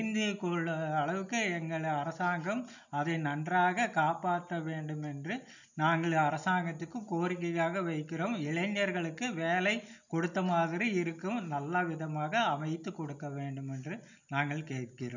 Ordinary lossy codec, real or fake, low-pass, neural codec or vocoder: none; real; 7.2 kHz; none